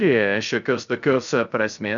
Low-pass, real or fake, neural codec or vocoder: 7.2 kHz; fake; codec, 16 kHz, 0.3 kbps, FocalCodec